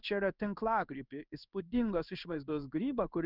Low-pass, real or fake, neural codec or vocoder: 5.4 kHz; fake; codec, 16 kHz in and 24 kHz out, 1 kbps, XY-Tokenizer